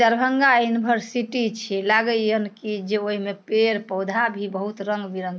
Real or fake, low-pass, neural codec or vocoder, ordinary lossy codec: real; none; none; none